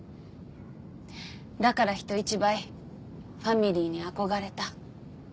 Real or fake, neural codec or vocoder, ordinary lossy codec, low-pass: real; none; none; none